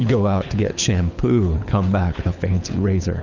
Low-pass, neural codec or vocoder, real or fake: 7.2 kHz; codec, 24 kHz, 6 kbps, HILCodec; fake